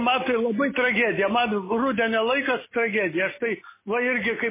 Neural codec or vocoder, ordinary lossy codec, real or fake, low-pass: none; MP3, 16 kbps; real; 3.6 kHz